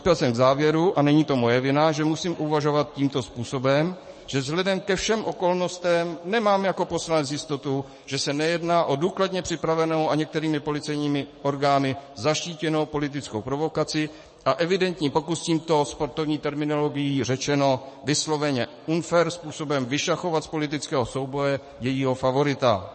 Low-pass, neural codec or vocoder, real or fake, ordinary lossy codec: 10.8 kHz; codec, 44.1 kHz, 7.8 kbps, DAC; fake; MP3, 32 kbps